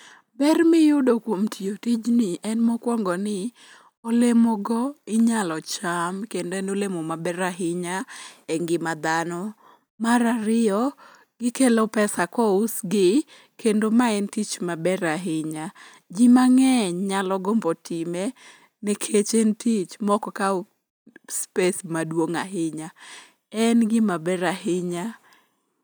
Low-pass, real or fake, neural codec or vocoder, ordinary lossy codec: none; real; none; none